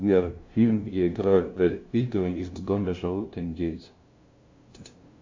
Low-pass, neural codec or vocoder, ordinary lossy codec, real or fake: 7.2 kHz; codec, 16 kHz, 0.5 kbps, FunCodec, trained on LibriTTS, 25 frames a second; MP3, 48 kbps; fake